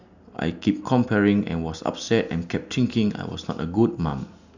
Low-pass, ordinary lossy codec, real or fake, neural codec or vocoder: 7.2 kHz; none; real; none